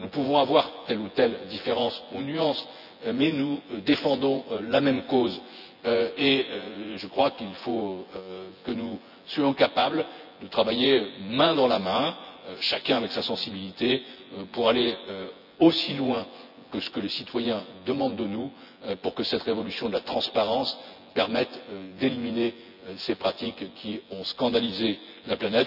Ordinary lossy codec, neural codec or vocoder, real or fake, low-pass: none; vocoder, 24 kHz, 100 mel bands, Vocos; fake; 5.4 kHz